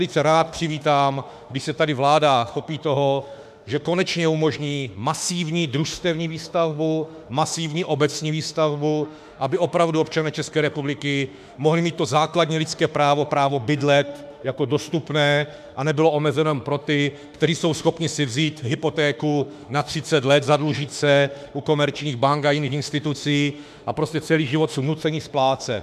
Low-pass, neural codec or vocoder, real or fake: 14.4 kHz; autoencoder, 48 kHz, 32 numbers a frame, DAC-VAE, trained on Japanese speech; fake